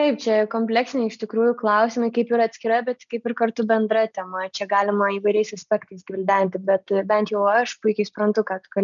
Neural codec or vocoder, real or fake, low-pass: none; real; 7.2 kHz